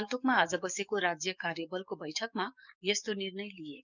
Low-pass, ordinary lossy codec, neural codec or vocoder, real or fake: 7.2 kHz; none; codec, 44.1 kHz, 7.8 kbps, DAC; fake